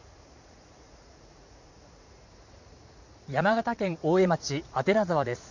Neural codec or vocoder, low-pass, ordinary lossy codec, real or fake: vocoder, 44.1 kHz, 80 mel bands, Vocos; 7.2 kHz; none; fake